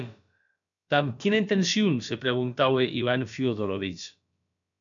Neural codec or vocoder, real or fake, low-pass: codec, 16 kHz, about 1 kbps, DyCAST, with the encoder's durations; fake; 7.2 kHz